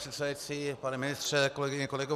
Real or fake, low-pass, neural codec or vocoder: real; 14.4 kHz; none